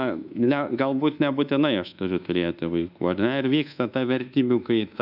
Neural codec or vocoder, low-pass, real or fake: codec, 24 kHz, 1.2 kbps, DualCodec; 5.4 kHz; fake